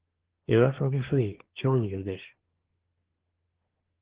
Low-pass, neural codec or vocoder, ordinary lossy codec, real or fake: 3.6 kHz; codec, 24 kHz, 1 kbps, SNAC; Opus, 16 kbps; fake